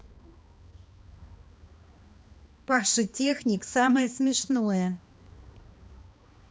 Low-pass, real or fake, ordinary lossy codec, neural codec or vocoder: none; fake; none; codec, 16 kHz, 2 kbps, X-Codec, HuBERT features, trained on balanced general audio